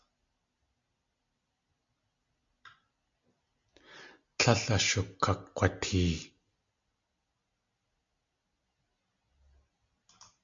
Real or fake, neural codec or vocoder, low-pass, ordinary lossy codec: real; none; 7.2 kHz; MP3, 64 kbps